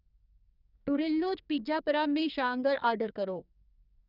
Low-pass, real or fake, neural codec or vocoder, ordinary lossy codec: 5.4 kHz; fake; codec, 44.1 kHz, 2.6 kbps, SNAC; none